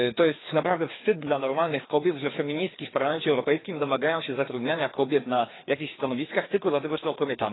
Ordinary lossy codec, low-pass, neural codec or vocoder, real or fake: AAC, 16 kbps; 7.2 kHz; codec, 16 kHz, 2 kbps, FreqCodec, larger model; fake